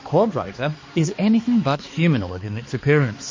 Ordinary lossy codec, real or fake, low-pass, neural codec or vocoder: MP3, 32 kbps; fake; 7.2 kHz; codec, 16 kHz, 2 kbps, X-Codec, HuBERT features, trained on balanced general audio